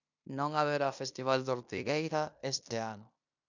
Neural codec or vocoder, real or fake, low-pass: codec, 16 kHz in and 24 kHz out, 0.9 kbps, LongCat-Audio-Codec, fine tuned four codebook decoder; fake; 7.2 kHz